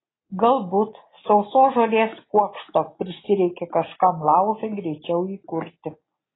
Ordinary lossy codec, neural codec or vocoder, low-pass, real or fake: AAC, 16 kbps; none; 7.2 kHz; real